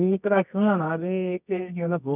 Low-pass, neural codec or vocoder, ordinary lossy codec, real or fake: 3.6 kHz; codec, 24 kHz, 0.9 kbps, WavTokenizer, medium music audio release; none; fake